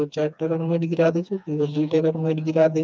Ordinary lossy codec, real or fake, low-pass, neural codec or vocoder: none; fake; none; codec, 16 kHz, 2 kbps, FreqCodec, smaller model